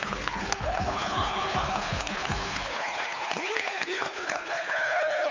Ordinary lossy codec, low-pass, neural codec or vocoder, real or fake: MP3, 48 kbps; 7.2 kHz; codec, 24 kHz, 3 kbps, HILCodec; fake